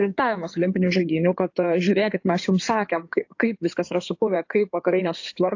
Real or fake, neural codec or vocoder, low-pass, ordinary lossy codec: fake; codec, 16 kHz in and 24 kHz out, 2.2 kbps, FireRedTTS-2 codec; 7.2 kHz; AAC, 48 kbps